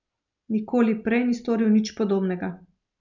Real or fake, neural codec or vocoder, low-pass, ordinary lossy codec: real; none; 7.2 kHz; none